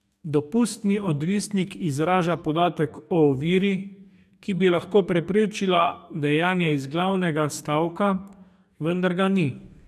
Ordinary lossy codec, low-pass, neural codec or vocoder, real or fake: none; 14.4 kHz; codec, 44.1 kHz, 2.6 kbps, DAC; fake